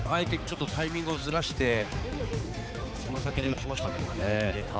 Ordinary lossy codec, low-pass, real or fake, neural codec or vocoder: none; none; fake; codec, 16 kHz, 4 kbps, X-Codec, HuBERT features, trained on balanced general audio